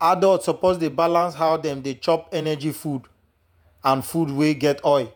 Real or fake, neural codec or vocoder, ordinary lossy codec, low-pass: fake; vocoder, 48 kHz, 128 mel bands, Vocos; none; none